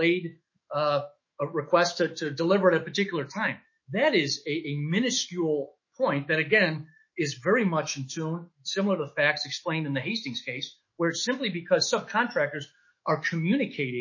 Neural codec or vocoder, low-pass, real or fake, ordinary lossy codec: autoencoder, 48 kHz, 128 numbers a frame, DAC-VAE, trained on Japanese speech; 7.2 kHz; fake; MP3, 32 kbps